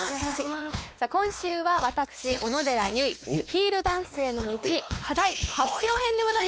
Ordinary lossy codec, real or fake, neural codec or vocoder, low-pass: none; fake; codec, 16 kHz, 2 kbps, X-Codec, WavLM features, trained on Multilingual LibriSpeech; none